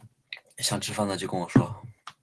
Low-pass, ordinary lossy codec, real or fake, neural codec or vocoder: 10.8 kHz; Opus, 16 kbps; real; none